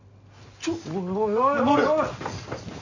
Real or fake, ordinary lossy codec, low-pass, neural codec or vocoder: real; Opus, 64 kbps; 7.2 kHz; none